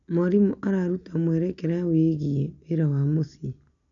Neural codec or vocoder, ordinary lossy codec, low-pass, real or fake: none; none; 7.2 kHz; real